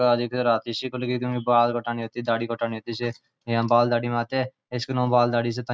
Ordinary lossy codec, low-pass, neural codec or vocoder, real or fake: none; none; none; real